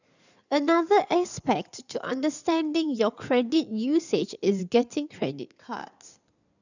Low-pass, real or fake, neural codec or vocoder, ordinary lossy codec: 7.2 kHz; fake; codec, 16 kHz in and 24 kHz out, 2.2 kbps, FireRedTTS-2 codec; none